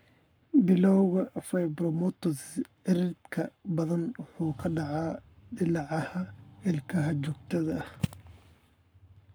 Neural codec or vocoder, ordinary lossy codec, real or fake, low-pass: codec, 44.1 kHz, 7.8 kbps, Pupu-Codec; none; fake; none